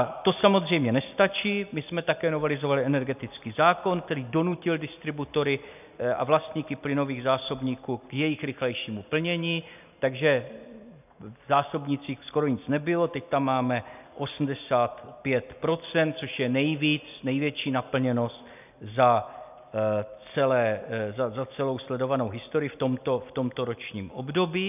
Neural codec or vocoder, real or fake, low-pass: none; real; 3.6 kHz